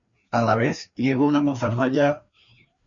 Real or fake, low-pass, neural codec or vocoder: fake; 7.2 kHz; codec, 16 kHz, 2 kbps, FreqCodec, larger model